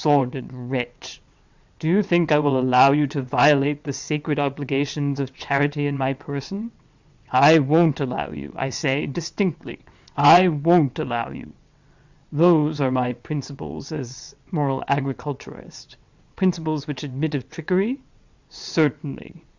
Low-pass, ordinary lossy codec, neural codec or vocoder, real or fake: 7.2 kHz; Opus, 64 kbps; vocoder, 22.05 kHz, 80 mel bands, WaveNeXt; fake